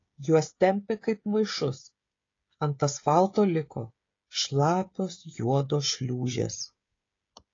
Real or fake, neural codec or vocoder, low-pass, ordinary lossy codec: fake; codec, 16 kHz, 16 kbps, FreqCodec, smaller model; 7.2 kHz; AAC, 32 kbps